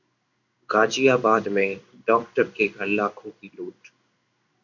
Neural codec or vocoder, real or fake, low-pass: codec, 16 kHz in and 24 kHz out, 1 kbps, XY-Tokenizer; fake; 7.2 kHz